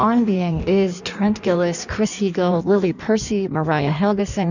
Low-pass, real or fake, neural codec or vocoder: 7.2 kHz; fake; codec, 16 kHz in and 24 kHz out, 1.1 kbps, FireRedTTS-2 codec